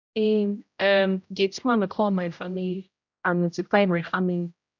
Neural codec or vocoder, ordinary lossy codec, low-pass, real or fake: codec, 16 kHz, 0.5 kbps, X-Codec, HuBERT features, trained on general audio; none; 7.2 kHz; fake